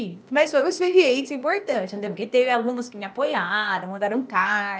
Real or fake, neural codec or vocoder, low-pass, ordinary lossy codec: fake; codec, 16 kHz, 0.8 kbps, ZipCodec; none; none